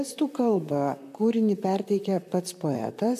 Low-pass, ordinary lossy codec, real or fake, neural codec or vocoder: 14.4 kHz; AAC, 96 kbps; fake; vocoder, 44.1 kHz, 128 mel bands, Pupu-Vocoder